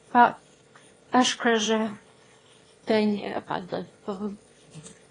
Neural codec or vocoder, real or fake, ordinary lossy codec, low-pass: autoencoder, 22.05 kHz, a latent of 192 numbers a frame, VITS, trained on one speaker; fake; AAC, 32 kbps; 9.9 kHz